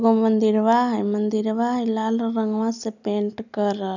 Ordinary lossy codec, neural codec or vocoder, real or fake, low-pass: none; none; real; 7.2 kHz